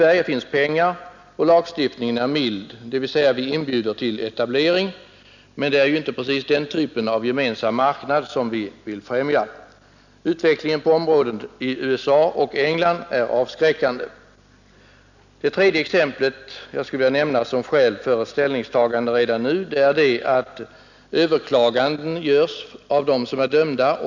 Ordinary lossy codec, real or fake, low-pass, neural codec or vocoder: none; real; 7.2 kHz; none